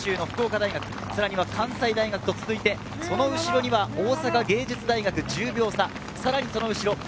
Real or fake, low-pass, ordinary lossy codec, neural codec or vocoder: real; none; none; none